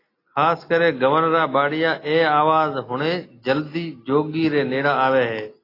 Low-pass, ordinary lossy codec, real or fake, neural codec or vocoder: 5.4 kHz; AAC, 24 kbps; real; none